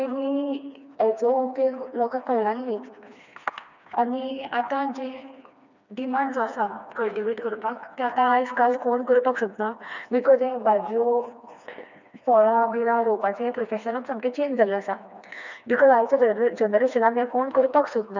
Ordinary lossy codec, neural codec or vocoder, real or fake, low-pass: none; codec, 16 kHz, 2 kbps, FreqCodec, smaller model; fake; 7.2 kHz